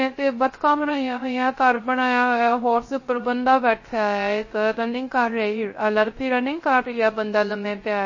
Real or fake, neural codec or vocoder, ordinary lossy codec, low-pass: fake; codec, 16 kHz, 0.3 kbps, FocalCodec; MP3, 32 kbps; 7.2 kHz